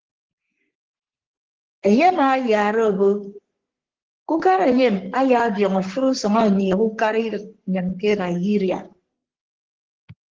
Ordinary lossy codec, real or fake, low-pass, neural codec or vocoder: Opus, 16 kbps; fake; 7.2 kHz; codec, 44.1 kHz, 3.4 kbps, Pupu-Codec